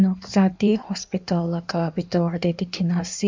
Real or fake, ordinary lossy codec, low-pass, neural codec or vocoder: fake; MP3, 64 kbps; 7.2 kHz; codec, 16 kHz in and 24 kHz out, 1.1 kbps, FireRedTTS-2 codec